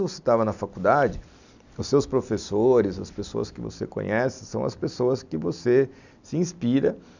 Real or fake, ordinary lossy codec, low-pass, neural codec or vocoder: real; none; 7.2 kHz; none